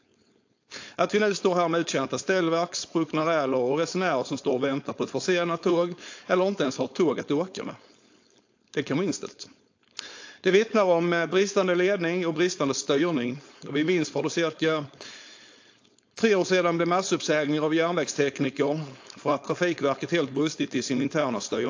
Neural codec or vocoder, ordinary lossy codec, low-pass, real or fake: codec, 16 kHz, 4.8 kbps, FACodec; AAC, 48 kbps; 7.2 kHz; fake